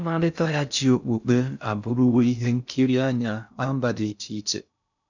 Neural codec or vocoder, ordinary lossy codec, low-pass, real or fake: codec, 16 kHz in and 24 kHz out, 0.6 kbps, FocalCodec, streaming, 2048 codes; none; 7.2 kHz; fake